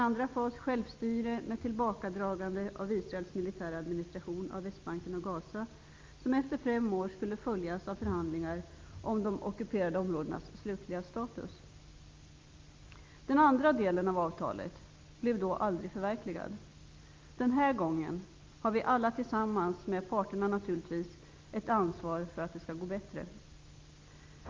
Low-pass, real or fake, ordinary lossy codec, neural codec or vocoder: 7.2 kHz; real; Opus, 24 kbps; none